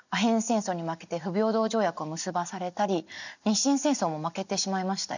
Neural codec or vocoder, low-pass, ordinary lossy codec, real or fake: none; 7.2 kHz; none; real